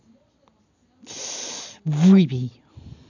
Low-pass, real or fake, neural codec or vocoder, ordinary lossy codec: 7.2 kHz; real; none; none